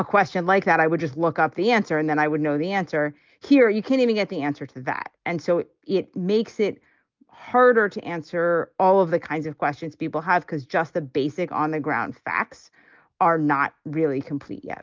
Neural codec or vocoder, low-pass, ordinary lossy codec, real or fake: none; 7.2 kHz; Opus, 24 kbps; real